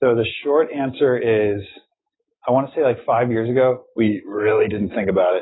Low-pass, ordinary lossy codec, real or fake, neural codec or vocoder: 7.2 kHz; AAC, 16 kbps; real; none